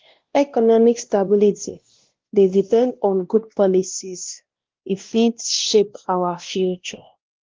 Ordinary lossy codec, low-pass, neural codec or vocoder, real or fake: Opus, 16 kbps; 7.2 kHz; codec, 16 kHz, 1 kbps, X-Codec, WavLM features, trained on Multilingual LibriSpeech; fake